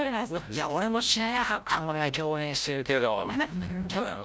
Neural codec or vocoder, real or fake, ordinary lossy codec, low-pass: codec, 16 kHz, 0.5 kbps, FreqCodec, larger model; fake; none; none